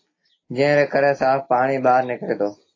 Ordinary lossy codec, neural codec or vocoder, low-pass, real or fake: AAC, 32 kbps; none; 7.2 kHz; real